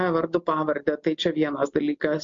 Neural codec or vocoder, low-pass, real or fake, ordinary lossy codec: none; 7.2 kHz; real; MP3, 48 kbps